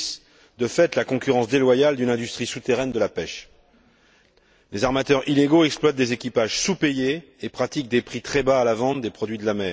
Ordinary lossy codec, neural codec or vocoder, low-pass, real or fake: none; none; none; real